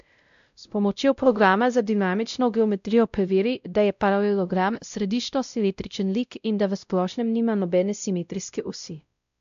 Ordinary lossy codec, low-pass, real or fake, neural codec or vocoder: AAC, 96 kbps; 7.2 kHz; fake; codec, 16 kHz, 0.5 kbps, X-Codec, WavLM features, trained on Multilingual LibriSpeech